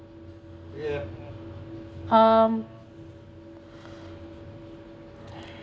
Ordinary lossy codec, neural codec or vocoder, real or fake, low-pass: none; none; real; none